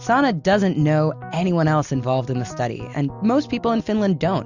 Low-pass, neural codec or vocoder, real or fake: 7.2 kHz; none; real